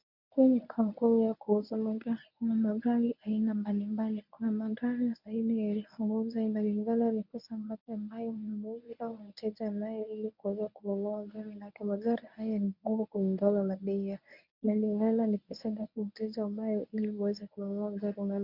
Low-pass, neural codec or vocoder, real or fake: 5.4 kHz; codec, 24 kHz, 0.9 kbps, WavTokenizer, medium speech release version 1; fake